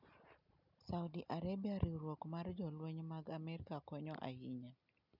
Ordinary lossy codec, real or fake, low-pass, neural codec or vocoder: none; real; 5.4 kHz; none